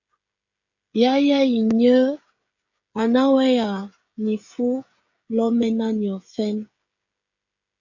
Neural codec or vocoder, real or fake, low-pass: codec, 16 kHz, 16 kbps, FreqCodec, smaller model; fake; 7.2 kHz